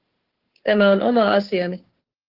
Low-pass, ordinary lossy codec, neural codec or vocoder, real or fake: 5.4 kHz; Opus, 16 kbps; codec, 16 kHz, 2 kbps, FunCodec, trained on Chinese and English, 25 frames a second; fake